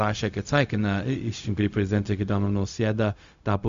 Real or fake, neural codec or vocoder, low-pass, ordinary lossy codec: fake; codec, 16 kHz, 0.4 kbps, LongCat-Audio-Codec; 7.2 kHz; MP3, 64 kbps